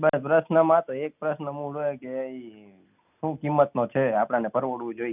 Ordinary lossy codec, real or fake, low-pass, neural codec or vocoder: none; real; 3.6 kHz; none